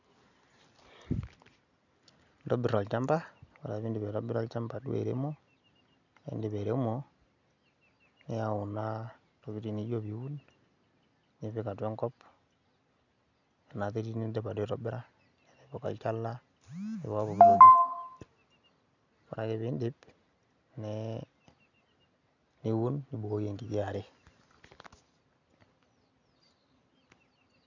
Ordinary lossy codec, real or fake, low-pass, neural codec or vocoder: none; real; 7.2 kHz; none